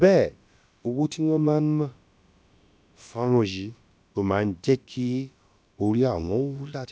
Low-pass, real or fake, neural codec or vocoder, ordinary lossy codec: none; fake; codec, 16 kHz, about 1 kbps, DyCAST, with the encoder's durations; none